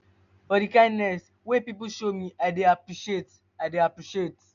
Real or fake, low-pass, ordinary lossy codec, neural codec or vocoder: real; 7.2 kHz; none; none